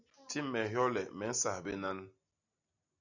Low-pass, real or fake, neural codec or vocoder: 7.2 kHz; real; none